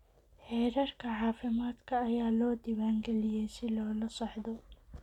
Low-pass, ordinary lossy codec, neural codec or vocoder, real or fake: 19.8 kHz; none; none; real